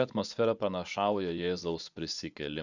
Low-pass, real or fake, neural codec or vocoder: 7.2 kHz; fake; vocoder, 24 kHz, 100 mel bands, Vocos